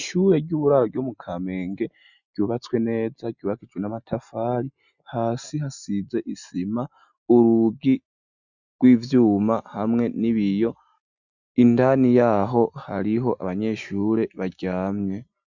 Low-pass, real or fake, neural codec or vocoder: 7.2 kHz; real; none